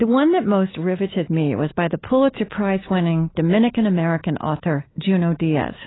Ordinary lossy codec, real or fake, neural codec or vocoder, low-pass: AAC, 16 kbps; fake; autoencoder, 48 kHz, 128 numbers a frame, DAC-VAE, trained on Japanese speech; 7.2 kHz